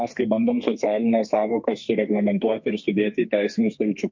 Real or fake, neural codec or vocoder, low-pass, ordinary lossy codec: fake; codec, 32 kHz, 1.9 kbps, SNAC; 7.2 kHz; MP3, 48 kbps